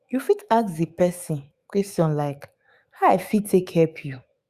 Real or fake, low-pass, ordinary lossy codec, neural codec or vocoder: fake; 14.4 kHz; Opus, 64 kbps; autoencoder, 48 kHz, 128 numbers a frame, DAC-VAE, trained on Japanese speech